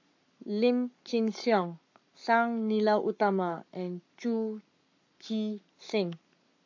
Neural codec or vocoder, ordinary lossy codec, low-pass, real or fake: codec, 44.1 kHz, 7.8 kbps, Pupu-Codec; AAC, 48 kbps; 7.2 kHz; fake